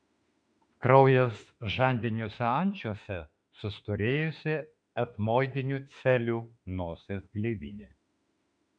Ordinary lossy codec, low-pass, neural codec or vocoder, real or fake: MP3, 96 kbps; 9.9 kHz; autoencoder, 48 kHz, 32 numbers a frame, DAC-VAE, trained on Japanese speech; fake